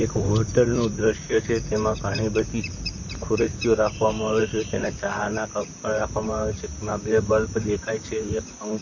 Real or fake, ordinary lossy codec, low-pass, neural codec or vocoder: fake; MP3, 32 kbps; 7.2 kHz; vocoder, 44.1 kHz, 128 mel bands, Pupu-Vocoder